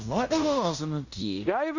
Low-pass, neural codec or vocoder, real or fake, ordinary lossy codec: 7.2 kHz; codec, 16 kHz in and 24 kHz out, 0.9 kbps, LongCat-Audio-Codec, fine tuned four codebook decoder; fake; none